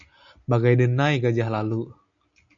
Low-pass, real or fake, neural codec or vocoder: 7.2 kHz; real; none